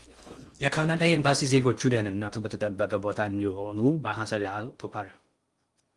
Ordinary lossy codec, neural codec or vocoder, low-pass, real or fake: Opus, 32 kbps; codec, 16 kHz in and 24 kHz out, 0.6 kbps, FocalCodec, streaming, 2048 codes; 10.8 kHz; fake